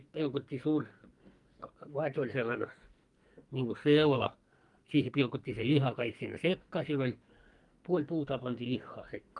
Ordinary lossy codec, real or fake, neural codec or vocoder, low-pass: Opus, 32 kbps; fake; codec, 44.1 kHz, 2.6 kbps, SNAC; 10.8 kHz